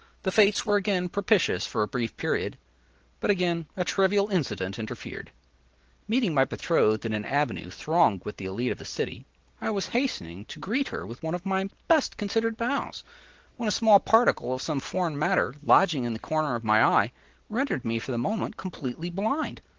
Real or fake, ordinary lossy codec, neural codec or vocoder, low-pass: real; Opus, 16 kbps; none; 7.2 kHz